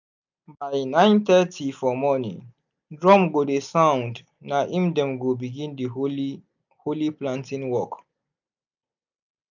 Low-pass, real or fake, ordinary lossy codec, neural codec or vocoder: 7.2 kHz; real; none; none